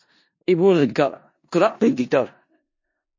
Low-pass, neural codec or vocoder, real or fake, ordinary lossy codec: 7.2 kHz; codec, 16 kHz in and 24 kHz out, 0.4 kbps, LongCat-Audio-Codec, four codebook decoder; fake; MP3, 32 kbps